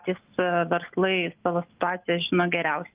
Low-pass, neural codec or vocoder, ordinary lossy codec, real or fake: 3.6 kHz; none; Opus, 24 kbps; real